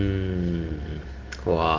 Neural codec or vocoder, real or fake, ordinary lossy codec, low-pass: none; real; Opus, 16 kbps; 7.2 kHz